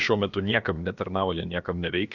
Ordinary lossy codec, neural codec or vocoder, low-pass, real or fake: Opus, 64 kbps; codec, 16 kHz, about 1 kbps, DyCAST, with the encoder's durations; 7.2 kHz; fake